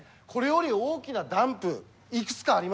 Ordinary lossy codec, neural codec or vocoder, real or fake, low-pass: none; none; real; none